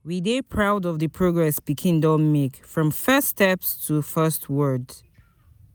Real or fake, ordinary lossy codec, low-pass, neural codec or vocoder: real; none; none; none